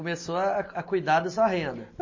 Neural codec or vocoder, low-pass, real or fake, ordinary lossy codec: none; 7.2 kHz; real; MP3, 32 kbps